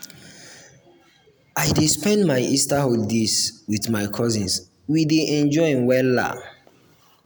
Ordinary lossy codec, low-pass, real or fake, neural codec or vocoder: none; none; real; none